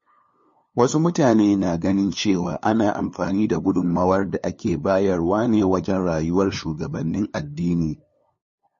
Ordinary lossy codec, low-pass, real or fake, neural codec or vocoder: MP3, 32 kbps; 7.2 kHz; fake; codec, 16 kHz, 2 kbps, FunCodec, trained on LibriTTS, 25 frames a second